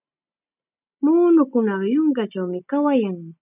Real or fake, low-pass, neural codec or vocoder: real; 3.6 kHz; none